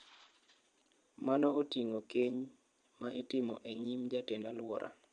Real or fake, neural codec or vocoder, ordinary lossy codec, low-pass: fake; vocoder, 22.05 kHz, 80 mel bands, Vocos; MP3, 64 kbps; 9.9 kHz